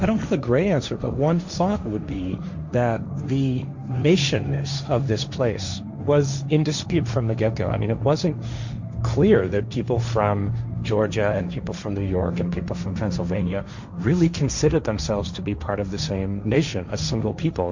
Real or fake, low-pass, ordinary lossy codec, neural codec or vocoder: fake; 7.2 kHz; Opus, 64 kbps; codec, 16 kHz, 1.1 kbps, Voila-Tokenizer